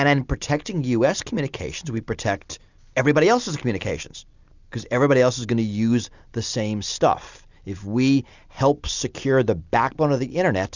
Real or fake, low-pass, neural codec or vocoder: real; 7.2 kHz; none